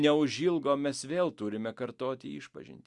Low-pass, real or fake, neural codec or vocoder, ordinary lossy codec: 10.8 kHz; real; none; Opus, 64 kbps